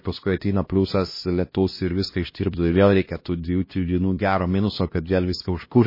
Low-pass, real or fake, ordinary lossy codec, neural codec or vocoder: 5.4 kHz; fake; MP3, 24 kbps; codec, 16 kHz, 1 kbps, X-Codec, HuBERT features, trained on LibriSpeech